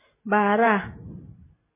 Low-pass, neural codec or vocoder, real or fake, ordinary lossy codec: 3.6 kHz; vocoder, 44.1 kHz, 128 mel bands every 512 samples, BigVGAN v2; fake; MP3, 16 kbps